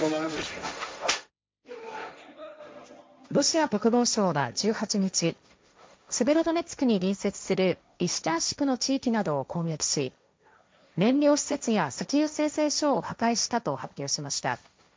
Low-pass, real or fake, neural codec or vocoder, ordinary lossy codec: none; fake; codec, 16 kHz, 1.1 kbps, Voila-Tokenizer; none